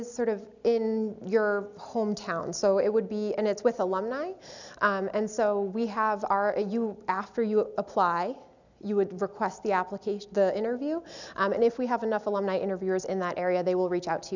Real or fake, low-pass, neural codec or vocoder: real; 7.2 kHz; none